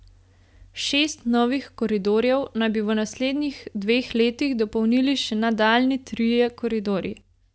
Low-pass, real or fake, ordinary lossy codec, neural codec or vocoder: none; real; none; none